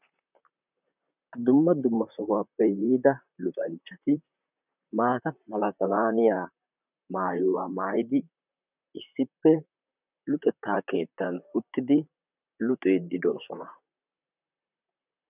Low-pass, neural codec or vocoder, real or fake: 3.6 kHz; vocoder, 44.1 kHz, 128 mel bands, Pupu-Vocoder; fake